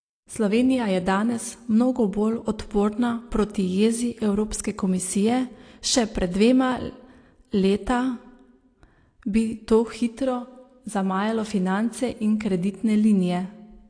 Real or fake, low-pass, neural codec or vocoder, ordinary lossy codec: real; 9.9 kHz; none; AAC, 48 kbps